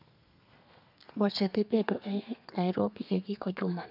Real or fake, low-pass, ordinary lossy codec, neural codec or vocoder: fake; 5.4 kHz; none; codec, 32 kHz, 1.9 kbps, SNAC